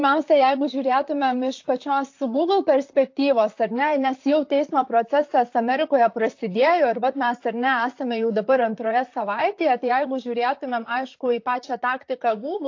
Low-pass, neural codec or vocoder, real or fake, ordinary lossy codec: 7.2 kHz; vocoder, 44.1 kHz, 128 mel bands, Pupu-Vocoder; fake; AAC, 48 kbps